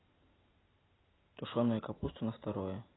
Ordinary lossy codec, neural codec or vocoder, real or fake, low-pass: AAC, 16 kbps; none; real; 7.2 kHz